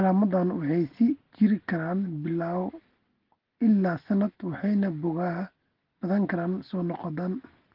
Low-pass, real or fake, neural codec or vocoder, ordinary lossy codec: 5.4 kHz; real; none; Opus, 16 kbps